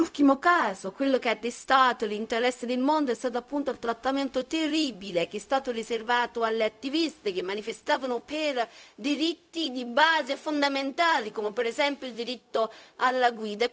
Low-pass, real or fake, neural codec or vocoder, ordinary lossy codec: none; fake; codec, 16 kHz, 0.4 kbps, LongCat-Audio-Codec; none